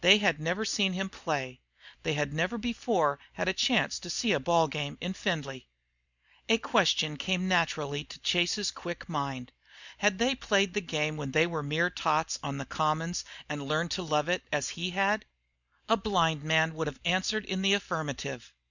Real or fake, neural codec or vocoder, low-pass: real; none; 7.2 kHz